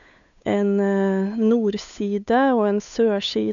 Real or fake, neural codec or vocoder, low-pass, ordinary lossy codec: fake; codec, 16 kHz, 8 kbps, FunCodec, trained on Chinese and English, 25 frames a second; 7.2 kHz; none